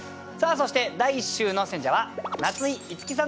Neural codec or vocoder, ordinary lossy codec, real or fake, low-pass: none; none; real; none